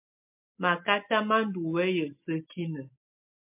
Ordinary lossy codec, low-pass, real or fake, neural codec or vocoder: MP3, 32 kbps; 3.6 kHz; real; none